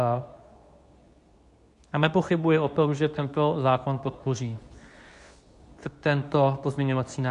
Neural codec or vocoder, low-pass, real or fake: codec, 24 kHz, 0.9 kbps, WavTokenizer, medium speech release version 2; 10.8 kHz; fake